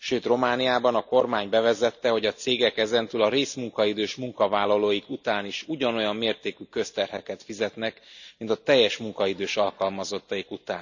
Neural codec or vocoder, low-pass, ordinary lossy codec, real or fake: none; 7.2 kHz; none; real